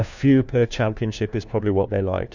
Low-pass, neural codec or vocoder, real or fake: 7.2 kHz; codec, 16 kHz, 1 kbps, FunCodec, trained on LibriTTS, 50 frames a second; fake